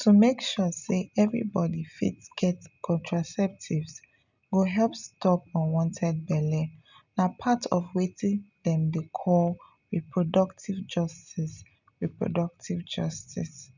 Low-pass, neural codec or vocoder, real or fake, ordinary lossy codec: 7.2 kHz; none; real; none